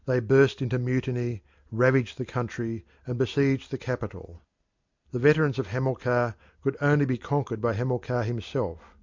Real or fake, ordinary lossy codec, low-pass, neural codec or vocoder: real; MP3, 64 kbps; 7.2 kHz; none